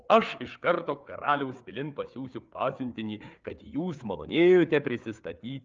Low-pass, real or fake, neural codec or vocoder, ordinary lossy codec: 7.2 kHz; fake; codec, 16 kHz, 4 kbps, FreqCodec, larger model; Opus, 24 kbps